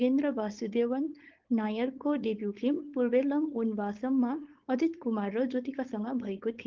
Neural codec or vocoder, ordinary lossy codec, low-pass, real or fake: codec, 16 kHz, 4.8 kbps, FACodec; Opus, 16 kbps; 7.2 kHz; fake